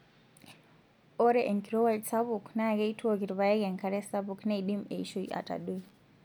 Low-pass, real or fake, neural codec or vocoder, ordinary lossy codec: 19.8 kHz; real; none; none